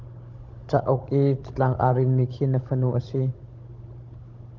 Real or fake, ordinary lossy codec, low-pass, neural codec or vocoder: fake; Opus, 32 kbps; 7.2 kHz; codec, 16 kHz, 16 kbps, FunCodec, trained on Chinese and English, 50 frames a second